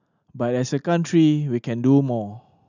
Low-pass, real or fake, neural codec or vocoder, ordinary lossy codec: 7.2 kHz; real; none; none